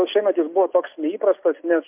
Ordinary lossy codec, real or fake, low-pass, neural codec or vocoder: MP3, 32 kbps; real; 3.6 kHz; none